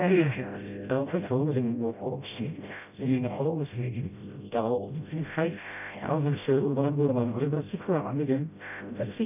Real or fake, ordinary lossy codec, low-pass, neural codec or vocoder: fake; none; 3.6 kHz; codec, 16 kHz, 0.5 kbps, FreqCodec, smaller model